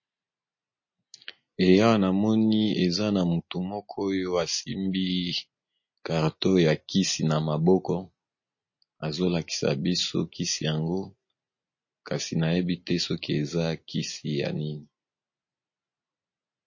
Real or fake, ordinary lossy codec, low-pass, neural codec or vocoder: real; MP3, 32 kbps; 7.2 kHz; none